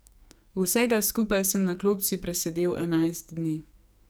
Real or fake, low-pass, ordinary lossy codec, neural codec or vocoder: fake; none; none; codec, 44.1 kHz, 2.6 kbps, SNAC